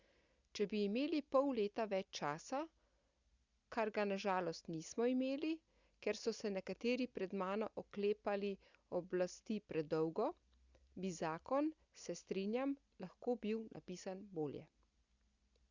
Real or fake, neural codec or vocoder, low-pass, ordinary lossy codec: real; none; 7.2 kHz; Opus, 64 kbps